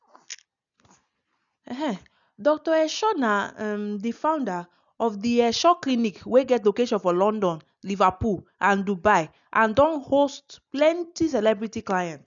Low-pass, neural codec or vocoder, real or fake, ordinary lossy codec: 7.2 kHz; none; real; none